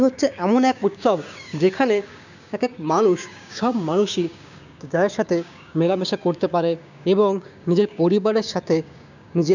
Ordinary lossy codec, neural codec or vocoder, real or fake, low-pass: none; codec, 16 kHz, 6 kbps, DAC; fake; 7.2 kHz